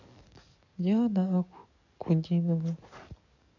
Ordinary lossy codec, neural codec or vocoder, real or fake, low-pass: none; codec, 44.1 kHz, 7.8 kbps, DAC; fake; 7.2 kHz